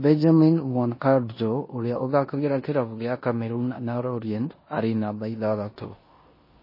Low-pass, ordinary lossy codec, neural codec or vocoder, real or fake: 5.4 kHz; MP3, 24 kbps; codec, 16 kHz in and 24 kHz out, 0.9 kbps, LongCat-Audio-Codec, four codebook decoder; fake